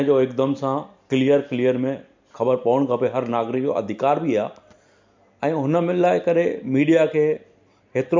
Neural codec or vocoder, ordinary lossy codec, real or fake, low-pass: none; MP3, 64 kbps; real; 7.2 kHz